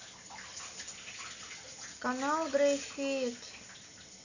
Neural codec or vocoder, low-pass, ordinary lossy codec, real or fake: none; 7.2 kHz; none; real